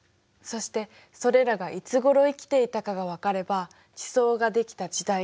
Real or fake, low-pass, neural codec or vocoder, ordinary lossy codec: real; none; none; none